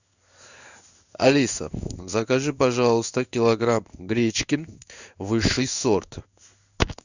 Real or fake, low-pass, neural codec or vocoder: fake; 7.2 kHz; codec, 16 kHz in and 24 kHz out, 1 kbps, XY-Tokenizer